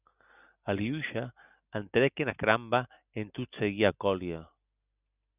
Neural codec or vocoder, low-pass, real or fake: none; 3.6 kHz; real